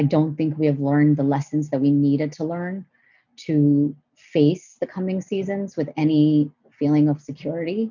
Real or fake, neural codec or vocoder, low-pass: real; none; 7.2 kHz